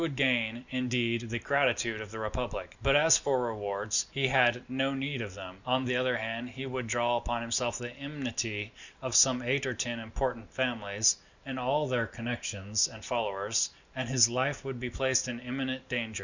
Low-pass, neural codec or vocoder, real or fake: 7.2 kHz; none; real